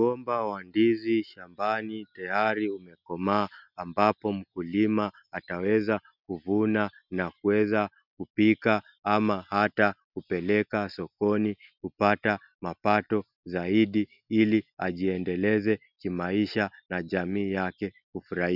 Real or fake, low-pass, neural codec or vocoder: real; 5.4 kHz; none